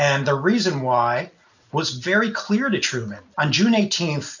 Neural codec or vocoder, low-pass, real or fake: none; 7.2 kHz; real